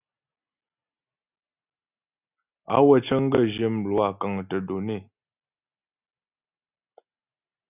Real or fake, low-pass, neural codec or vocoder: real; 3.6 kHz; none